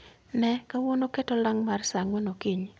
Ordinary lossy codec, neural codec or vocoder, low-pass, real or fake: none; none; none; real